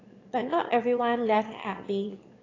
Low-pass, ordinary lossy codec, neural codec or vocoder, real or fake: 7.2 kHz; AAC, 32 kbps; autoencoder, 22.05 kHz, a latent of 192 numbers a frame, VITS, trained on one speaker; fake